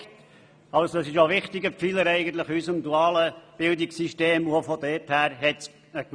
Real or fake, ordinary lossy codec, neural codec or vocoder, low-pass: real; none; none; 9.9 kHz